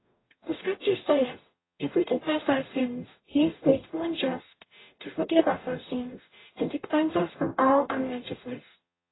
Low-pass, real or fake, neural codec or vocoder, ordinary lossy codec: 7.2 kHz; fake; codec, 44.1 kHz, 0.9 kbps, DAC; AAC, 16 kbps